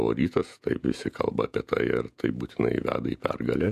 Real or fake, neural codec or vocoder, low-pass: fake; vocoder, 48 kHz, 128 mel bands, Vocos; 14.4 kHz